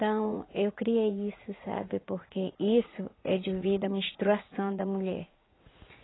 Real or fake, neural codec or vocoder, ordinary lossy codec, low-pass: fake; vocoder, 44.1 kHz, 80 mel bands, Vocos; AAC, 16 kbps; 7.2 kHz